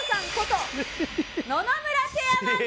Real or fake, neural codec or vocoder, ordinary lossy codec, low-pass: real; none; none; none